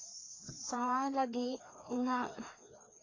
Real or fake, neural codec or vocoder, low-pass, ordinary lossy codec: fake; codec, 16 kHz, 2 kbps, FreqCodec, larger model; 7.2 kHz; AAC, 32 kbps